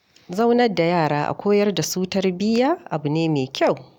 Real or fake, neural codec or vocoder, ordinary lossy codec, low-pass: real; none; none; 19.8 kHz